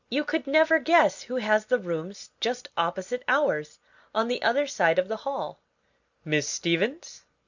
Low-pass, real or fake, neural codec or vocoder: 7.2 kHz; real; none